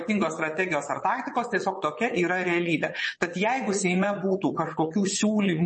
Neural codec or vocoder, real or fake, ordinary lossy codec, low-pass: vocoder, 22.05 kHz, 80 mel bands, WaveNeXt; fake; MP3, 32 kbps; 9.9 kHz